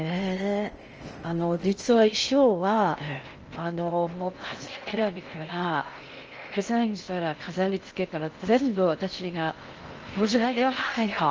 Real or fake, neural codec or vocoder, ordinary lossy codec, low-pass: fake; codec, 16 kHz in and 24 kHz out, 0.6 kbps, FocalCodec, streaming, 2048 codes; Opus, 24 kbps; 7.2 kHz